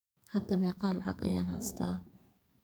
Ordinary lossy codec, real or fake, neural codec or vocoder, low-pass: none; fake; codec, 44.1 kHz, 2.6 kbps, SNAC; none